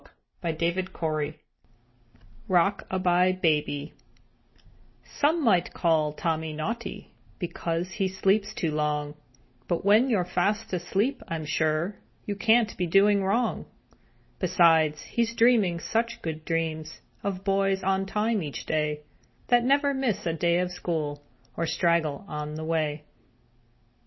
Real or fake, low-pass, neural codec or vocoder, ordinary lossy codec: real; 7.2 kHz; none; MP3, 24 kbps